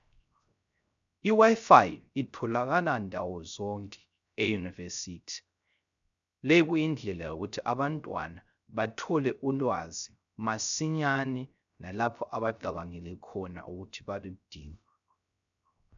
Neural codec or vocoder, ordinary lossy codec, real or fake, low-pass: codec, 16 kHz, 0.3 kbps, FocalCodec; MP3, 96 kbps; fake; 7.2 kHz